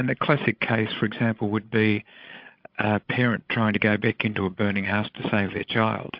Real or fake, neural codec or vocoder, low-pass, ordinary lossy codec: fake; codec, 16 kHz, 16 kbps, FreqCodec, larger model; 5.4 kHz; MP3, 48 kbps